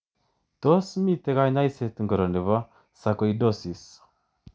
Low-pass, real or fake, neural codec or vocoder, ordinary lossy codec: none; real; none; none